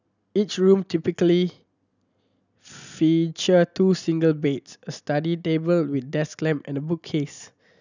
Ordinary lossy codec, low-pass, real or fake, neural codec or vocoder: none; 7.2 kHz; real; none